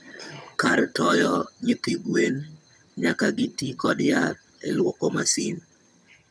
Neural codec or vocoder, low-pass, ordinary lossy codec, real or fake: vocoder, 22.05 kHz, 80 mel bands, HiFi-GAN; none; none; fake